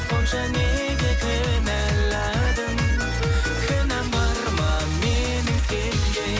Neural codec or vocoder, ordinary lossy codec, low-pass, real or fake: none; none; none; real